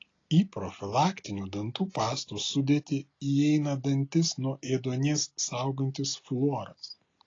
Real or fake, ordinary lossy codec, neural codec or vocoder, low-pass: real; AAC, 32 kbps; none; 7.2 kHz